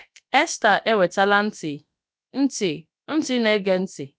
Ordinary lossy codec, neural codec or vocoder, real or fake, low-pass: none; codec, 16 kHz, about 1 kbps, DyCAST, with the encoder's durations; fake; none